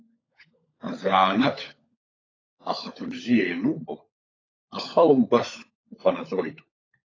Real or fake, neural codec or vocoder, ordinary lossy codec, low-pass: fake; codec, 16 kHz, 16 kbps, FunCodec, trained on LibriTTS, 50 frames a second; AAC, 32 kbps; 7.2 kHz